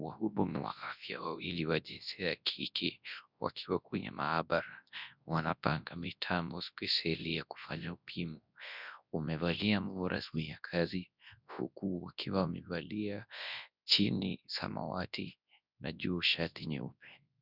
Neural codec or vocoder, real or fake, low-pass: codec, 24 kHz, 0.9 kbps, WavTokenizer, large speech release; fake; 5.4 kHz